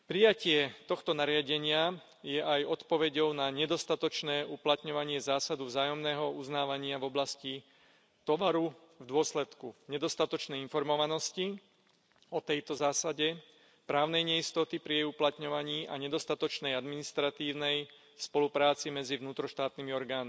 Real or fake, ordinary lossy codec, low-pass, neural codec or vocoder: real; none; none; none